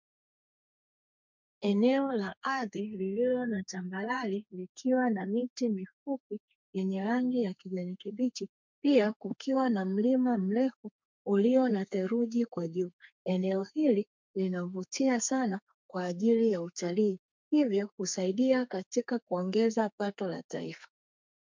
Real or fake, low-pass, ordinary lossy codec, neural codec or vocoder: fake; 7.2 kHz; MP3, 64 kbps; codec, 32 kHz, 1.9 kbps, SNAC